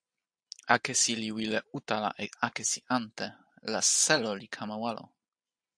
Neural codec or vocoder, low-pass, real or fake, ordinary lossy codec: none; 9.9 kHz; real; AAC, 64 kbps